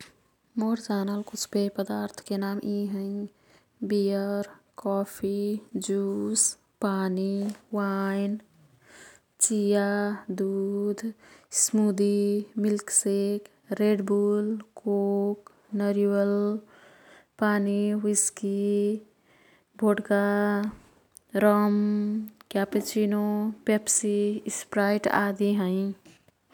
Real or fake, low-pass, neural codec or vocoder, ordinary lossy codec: real; 19.8 kHz; none; none